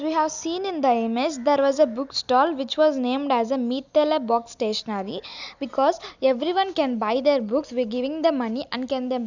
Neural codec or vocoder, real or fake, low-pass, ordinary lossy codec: none; real; 7.2 kHz; none